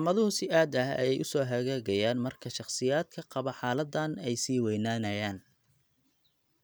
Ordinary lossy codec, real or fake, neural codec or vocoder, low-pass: none; real; none; none